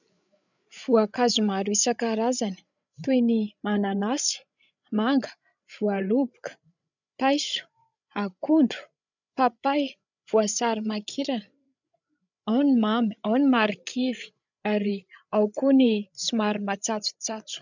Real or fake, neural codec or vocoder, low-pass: fake; codec, 16 kHz, 8 kbps, FreqCodec, larger model; 7.2 kHz